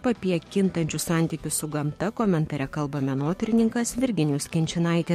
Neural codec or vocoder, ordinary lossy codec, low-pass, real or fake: codec, 44.1 kHz, 7.8 kbps, DAC; MP3, 64 kbps; 19.8 kHz; fake